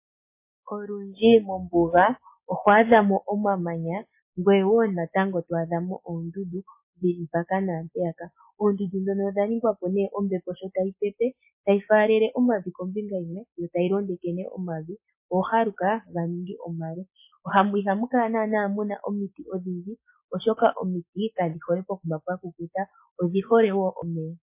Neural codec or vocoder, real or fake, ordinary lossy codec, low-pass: none; real; MP3, 24 kbps; 3.6 kHz